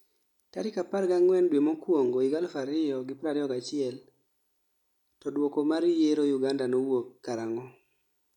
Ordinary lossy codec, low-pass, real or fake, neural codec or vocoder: none; 19.8 kHz; real; none